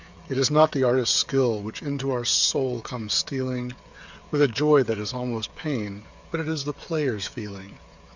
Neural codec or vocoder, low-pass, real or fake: codec, 16 kHz, 8 kbps, FreqCodec, smaller model; 7.2 kHz; fake